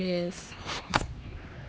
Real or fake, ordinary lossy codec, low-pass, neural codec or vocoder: fake; none; none; codec, 16 kHz, 2 kbps, X-Codec, HuBERT features, trained on LibriSpeech